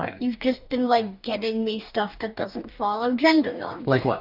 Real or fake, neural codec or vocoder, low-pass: fake; codec, 44.1 kHz, 2.6 kbps, DAC; 5.4 kHz